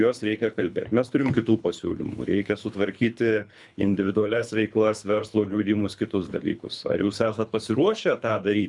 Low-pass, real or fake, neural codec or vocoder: 10.8 kHz; fake; codec, 24 kHz, 3 kbps, HILCodec